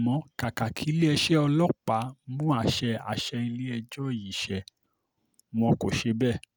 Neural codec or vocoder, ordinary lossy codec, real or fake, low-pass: none; none; real; none